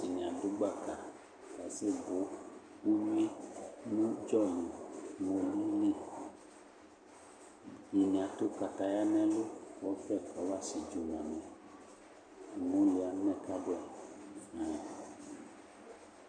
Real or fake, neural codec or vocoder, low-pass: real; none; 9.9 kHz